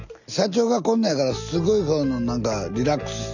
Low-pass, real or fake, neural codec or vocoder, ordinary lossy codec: 7.2 kHz; real; none; none